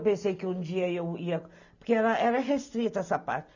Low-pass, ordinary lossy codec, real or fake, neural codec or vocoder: 7.2 kHz; none; real; none